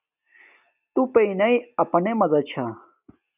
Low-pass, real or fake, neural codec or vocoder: 3.6 kHz; real; none